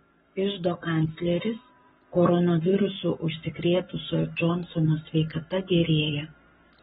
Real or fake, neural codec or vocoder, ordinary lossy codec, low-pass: fake; codec, 44.1 kHz, 7.8 kbps, Pupu-Codec; AAC, 16 kbps; 19.8 kHz